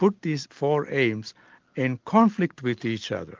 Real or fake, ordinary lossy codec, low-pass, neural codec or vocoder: real; Opus, 32 kbps; 7.2 kHz; none